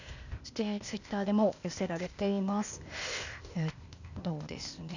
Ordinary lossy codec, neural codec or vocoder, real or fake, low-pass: none; codec, 16 kHz, 0.8 kbps, ZipCodec; fake; 7.2 kHz